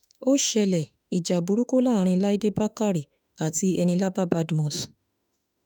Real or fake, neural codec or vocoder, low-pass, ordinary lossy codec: fake; autoencoder, 48 kHz, 32 numbers a frame, DAC-VAE, trained on Japanese speech; 19.8 kHz; none